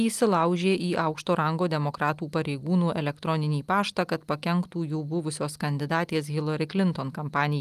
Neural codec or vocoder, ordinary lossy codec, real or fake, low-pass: none; Opus, 32 kbps; real; 14.4 kHz